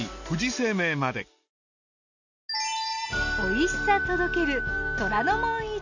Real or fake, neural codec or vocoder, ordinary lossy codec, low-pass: real; none; none; 7.2 kHz